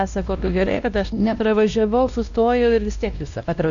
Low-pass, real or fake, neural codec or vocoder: 7.2 kHz; fake; codec, 16 kHz, 1 kbps, X-Codec, WavLM features, trained on Multilingual LibriSpeech